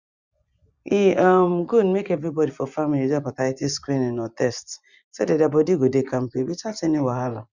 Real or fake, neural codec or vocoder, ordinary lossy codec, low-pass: fake; vocoder, 24 kHz, 100 mel bands, Vocos; Opus, 64 kbps; 7.2 kHz